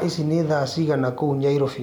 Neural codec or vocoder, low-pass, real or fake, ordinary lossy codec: none; 19.8 kHz; real; none